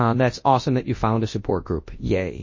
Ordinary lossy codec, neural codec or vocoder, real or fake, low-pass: MP3, 32 kbps; codec, 24 kHz, 0.9 kbps, WavTokenizer, large speech release; fake; 7.2 kHz